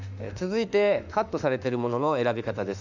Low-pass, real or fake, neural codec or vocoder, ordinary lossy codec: 7.2 kHz; fake; autoencoder, 48 kHz, 32 numbers a frame, DAC-VAE, trained on Japanese speech; none